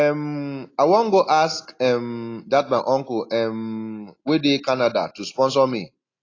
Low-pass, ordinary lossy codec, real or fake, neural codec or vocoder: 7.2 kHz; AAC, 32 kbps; real; none